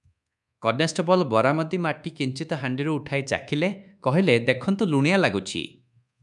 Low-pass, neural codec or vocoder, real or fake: 10.8 kHz; codec, 24 kHz, 1.2 kbps, DualCodec; fake